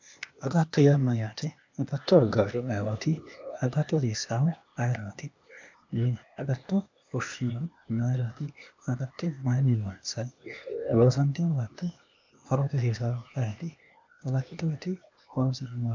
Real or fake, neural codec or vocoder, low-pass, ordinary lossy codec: fake; codec, 16 kHz, 0.8 kbps, ZipCodec; 7.2 kHz; MP3, 64 kbps